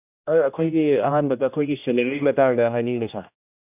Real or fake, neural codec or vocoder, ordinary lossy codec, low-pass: fake; codec, 16 kHz, 1 kbps, X-Codec, HuBERT features, trained on general audio; none; 3.6 kHz